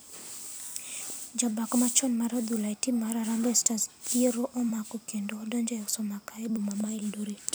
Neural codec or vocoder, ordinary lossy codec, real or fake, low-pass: vocoder, 44.1 kHz, 128 mel bands every 256 samples, BigVGAN v2; none; fake; none